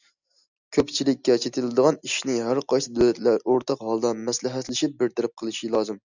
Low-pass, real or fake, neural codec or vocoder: 7.2 kHz; real; none